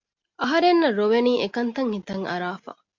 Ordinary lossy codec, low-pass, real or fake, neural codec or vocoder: MP3, 48 kbps; 7.2 kHz; real; none